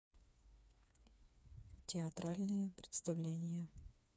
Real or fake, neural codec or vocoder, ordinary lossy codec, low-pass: fake; codec, 16 kHz, 4 kbps, FreqCodec, smaller model; none; none